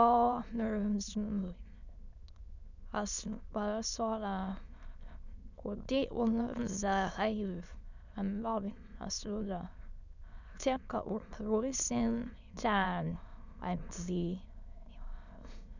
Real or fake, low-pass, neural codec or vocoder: fake; 7.2 kHz; autoencoder, 22.05 kHz, a latent of 192 numbers a frame, VITS, trained on many speakers